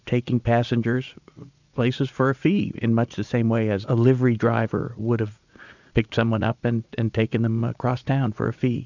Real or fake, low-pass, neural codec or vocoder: fake; 7.2 kHz; vocoder, 44.1 kHz, 80 mel bands, Vocos